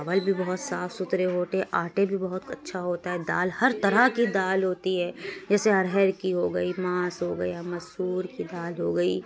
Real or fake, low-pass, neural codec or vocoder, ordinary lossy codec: real; none; none; none